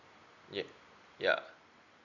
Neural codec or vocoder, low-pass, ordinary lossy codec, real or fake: none; 7.2 kHz; none; real